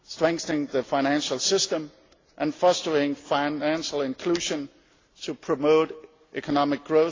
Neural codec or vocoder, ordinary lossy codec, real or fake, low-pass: none; AAC, 32 kbps; real; 7.2 kHz